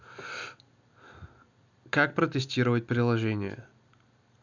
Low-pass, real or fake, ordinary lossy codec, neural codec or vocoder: 7.2 kHz; real; none; none